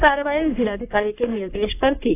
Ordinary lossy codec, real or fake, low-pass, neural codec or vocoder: AAC, 32 kbps; fake; 3.6 kHz; codec, 44.1 kHz, 3.4 kbps, Pupu-Codec